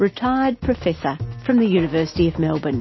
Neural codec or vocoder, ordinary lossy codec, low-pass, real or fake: none; MP3, 24 kbps; 7.2 kHz; real